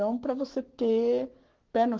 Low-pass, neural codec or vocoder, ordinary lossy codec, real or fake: 7.2 kHz; codec, 44.1 kHz, 7.8 kbps, Pupu-Codec; Opus, 16 kbps; fake